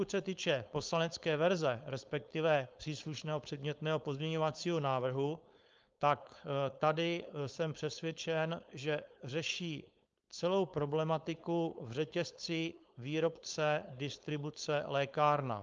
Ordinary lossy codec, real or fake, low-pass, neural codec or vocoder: Opus, 24 kbps; fake; 7.2 kHz; codec, 16 kHz, 4.8 kbps, FACodec